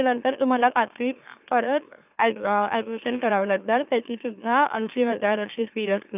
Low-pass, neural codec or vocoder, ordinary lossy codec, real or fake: 3.6 kHz; autoencoder, 44.1 kHz, a latent of 192 numbers a frame, MeloTTS; none; fake